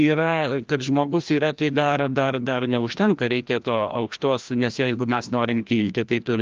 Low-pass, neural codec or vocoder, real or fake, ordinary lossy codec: 7.2 kHz; codec, 16 kHz, 1 kbps, FreqCodec, larger model; fake; Opus, 24 kbps